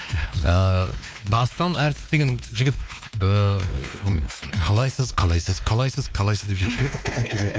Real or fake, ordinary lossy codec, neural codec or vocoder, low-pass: fake; none; codec, 16 kHz, 2 kbps, X-Codec, WavLM features, trained on Multilingual LibriSpeech; none